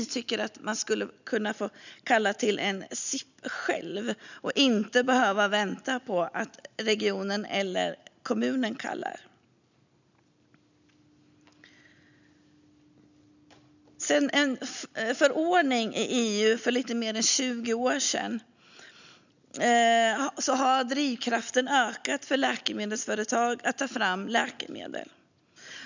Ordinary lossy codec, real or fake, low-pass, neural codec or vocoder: none; real; 7.2 kHz; none